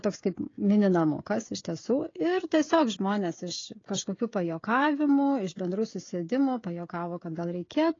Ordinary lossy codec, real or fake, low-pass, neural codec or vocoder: AAC, 32 kbps; fake; 7.2 kHz; codec, 16 kHz, 16 kbps, FreqCodec, smaller model